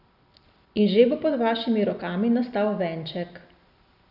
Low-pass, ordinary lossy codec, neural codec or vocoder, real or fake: 5.4 kHz; none; none; real